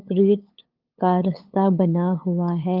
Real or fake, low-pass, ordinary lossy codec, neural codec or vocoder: fake; 5.4 kHz; Opus, 32 kbps; codec, 16 kHz, 8 kbps, FunCodec, trained on LibriTTS, 25 frames a second